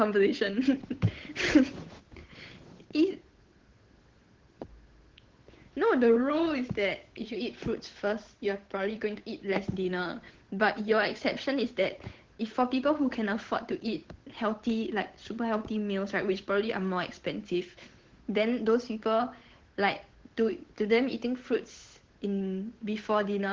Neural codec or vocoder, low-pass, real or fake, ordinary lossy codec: codec, 16 kHz, 8 kbps, FunCodec, trained on Chinese and English, 25 frames a second; 7.2 kHz; fake; Opus, 16 kbps